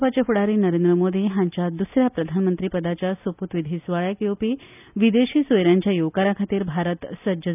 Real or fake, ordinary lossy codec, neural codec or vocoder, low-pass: real; none; none; 3.6 kHz